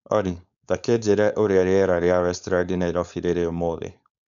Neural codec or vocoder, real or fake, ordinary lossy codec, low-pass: codec, 16 kHz, 4.8 kbps, FACodec; fake; none; 7.2 kHz